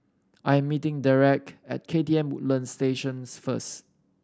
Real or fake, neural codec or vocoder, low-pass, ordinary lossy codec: real; none; none; none